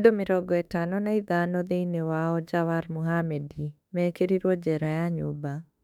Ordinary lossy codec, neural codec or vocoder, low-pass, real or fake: none; autoencoder, 48 kHz, 32 numbers a frame, DAC-VAE, trained on Japanese speech; 19.8 kHz; fake